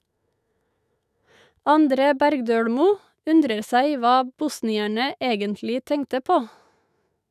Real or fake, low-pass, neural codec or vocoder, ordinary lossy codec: fake; 14.4 kHz; autoencoder, 48 kHz, 128 numbers a frame, DAC-VAE, trained on Japanese speech; none